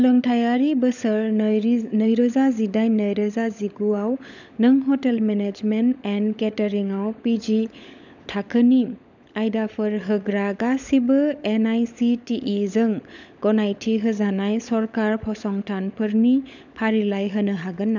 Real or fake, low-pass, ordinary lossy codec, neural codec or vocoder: fake; 7.2 kHz; none; codec, 16 kHz, 16 kbps, FunCodec, trained on LibriTTS, 50 frames a second